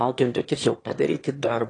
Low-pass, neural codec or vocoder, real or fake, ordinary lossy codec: 9.9 kHz; autoencoder, 22.05 kHz, a latent of 192 numbers a frame, VITS, trained on one speaker; fake; AAC, 32 kbps